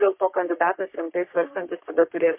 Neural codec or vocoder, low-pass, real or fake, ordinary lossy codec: codec, 44.1 kHz, 3.4 kbps, Pupu-Codec; 3.6 kHz; fake; MP3, 32 kbps